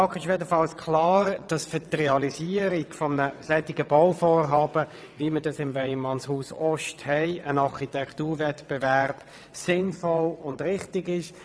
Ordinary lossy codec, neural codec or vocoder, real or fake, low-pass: none; vocoder, 22.05 kHz, 80 mel bands, WaveNeXt; fake; none